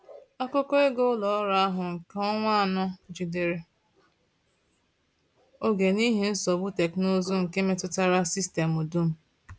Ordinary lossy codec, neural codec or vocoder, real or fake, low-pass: none; none; real; none